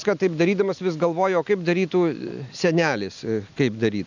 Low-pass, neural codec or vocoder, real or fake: 7.2 kHz; none; real